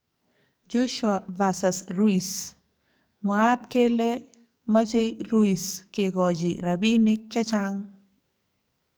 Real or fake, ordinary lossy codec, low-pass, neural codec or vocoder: fake; none; none; codec, 44.1 kHz, 2.6 kbps, SNAC